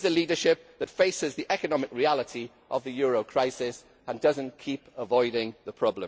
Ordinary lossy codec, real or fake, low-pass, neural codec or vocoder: none; real; none; none